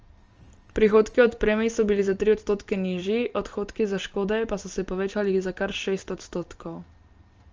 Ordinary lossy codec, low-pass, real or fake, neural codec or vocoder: Opus, 24 kbps; 7.2 kHz; real; none